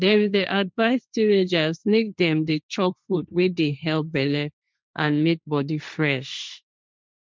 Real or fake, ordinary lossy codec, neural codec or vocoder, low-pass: fake; none; codec, 16 kHz, 1.1 kbps, Voila-Tokenizer; none